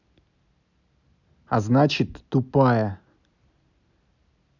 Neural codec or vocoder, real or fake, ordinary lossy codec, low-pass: none; real; none; 7.2 kHz